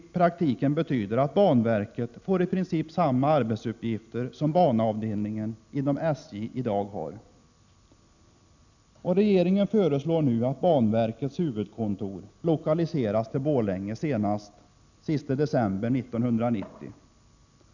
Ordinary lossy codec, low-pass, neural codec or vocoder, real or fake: none; 7.2 kHz; none; real